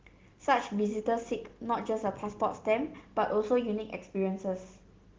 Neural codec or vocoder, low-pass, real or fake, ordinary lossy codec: none; 7.2 kHz; real; Opus, 16 kbps